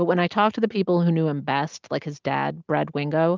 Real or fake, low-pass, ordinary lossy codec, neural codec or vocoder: real; 7.2 kHz; Opus, 32 kbps; none